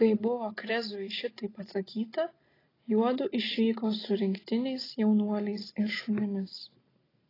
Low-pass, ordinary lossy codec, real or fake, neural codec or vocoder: 5.4 kHz; AAC, 24 kbps; real; none